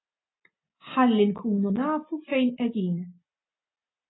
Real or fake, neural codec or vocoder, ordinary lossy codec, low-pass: real; none; AAC, 16 kbps; 7.2 kHz